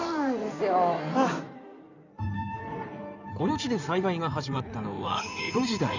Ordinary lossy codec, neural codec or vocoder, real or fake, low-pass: none; codec, 16 kHz in and 24 kHz out, 2.2 kbps, FireRedTTS-2 codec; fake; 7.2 kHz